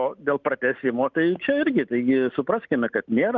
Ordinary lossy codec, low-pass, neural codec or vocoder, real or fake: Opus, 24 kbps; 7.2 kHz; none; real